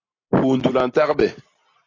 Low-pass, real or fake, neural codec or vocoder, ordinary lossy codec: 7.2 kHz; real; none; AAC, 32 kbps